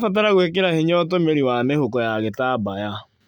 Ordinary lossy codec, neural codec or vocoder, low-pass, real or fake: none; none; 19.8 kHz; real